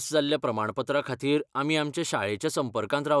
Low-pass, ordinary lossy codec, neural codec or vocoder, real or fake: none; none; none; real